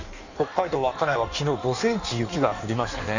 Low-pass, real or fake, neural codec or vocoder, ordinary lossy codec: 7.2 kHz; fake; codec, 16 kHz in and 24 kHz out, 1.1 kbps, FireRedTTS-2 codec; none